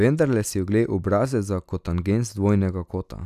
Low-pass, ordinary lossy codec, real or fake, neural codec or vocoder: 14.4 kHz; none; real; none